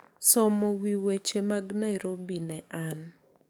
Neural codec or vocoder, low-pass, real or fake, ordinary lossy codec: codec, 44.1 kHz, 7.8 kbps, DAC; none; fake; none